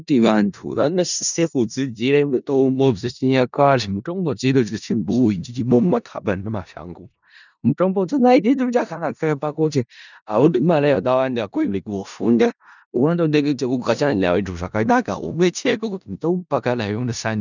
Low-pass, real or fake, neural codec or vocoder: 7.2 kHz; fake; codec, 16 kHz in and 24 kHz out, 0.4 kbps, LongCat-Audio-Codec, four codebook decoder